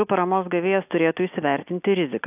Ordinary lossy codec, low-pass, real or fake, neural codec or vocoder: AAC, 32 kbps; 3.6 kHz; real; none